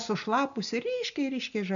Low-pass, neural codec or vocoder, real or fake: 7.2 kHz; none; real